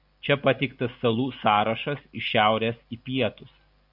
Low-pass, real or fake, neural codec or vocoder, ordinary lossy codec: 5.4 kHz; real; none; AAC, 48 kbps